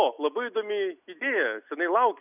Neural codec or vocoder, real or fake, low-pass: none; real; 3.6 kHz